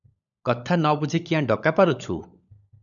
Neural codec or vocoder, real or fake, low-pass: codec, 16 kHz, 16 kbps, FunCodec, trained on LibriTTS, 50 frames a second; fake; 7.2 kHz